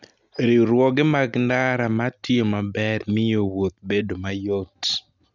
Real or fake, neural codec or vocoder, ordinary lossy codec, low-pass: real; none; none; 7.2 kHz